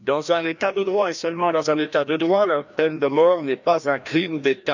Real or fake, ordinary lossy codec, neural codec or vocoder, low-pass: fake; none; codec, 16 kHz, 1 kbps, FreqCodec, larger model; 7.2 kHz